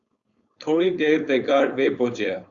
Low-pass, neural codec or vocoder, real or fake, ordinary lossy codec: 7.2 kHz; codec, 16 kHz, 4.8 kbps, FACodec; fake; Opus, 64 kbps